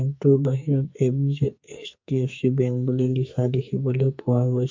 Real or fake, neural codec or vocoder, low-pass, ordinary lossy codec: fake; codec, 44.1 kHz, 2.6 kbps, SNAC; 7.2 kHz; MP3, 48 kbps